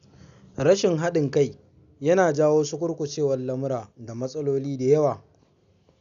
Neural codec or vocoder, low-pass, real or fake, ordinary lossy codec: none; 7.2 kHz; real; none